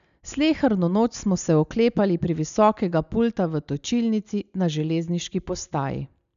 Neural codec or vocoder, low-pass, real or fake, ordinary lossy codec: none; 7.2 kHz; real; none